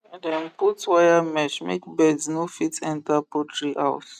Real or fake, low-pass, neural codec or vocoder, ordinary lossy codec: real; 14.4 kHz; none; none